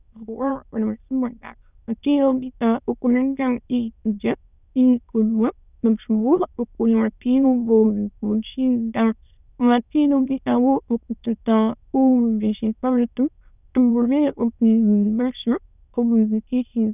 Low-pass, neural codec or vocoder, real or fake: 3.6 kHz; autoencoder, 22.05 kHz, a latent of 192 numbers a frame, VITS, trained on many speakers; fake